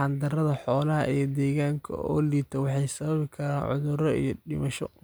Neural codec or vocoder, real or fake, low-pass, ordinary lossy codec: none; real; none; none